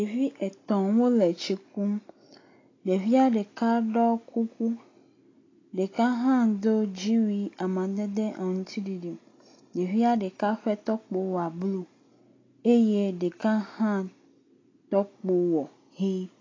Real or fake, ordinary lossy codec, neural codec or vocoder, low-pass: real; AAC, 32 kbps; none; 7.2 kHz